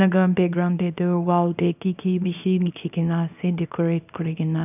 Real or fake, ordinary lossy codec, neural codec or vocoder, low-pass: fake; none; codec, 24 kHz, 0.9 kbps, WavTokenizer, small release; 3.6 kHz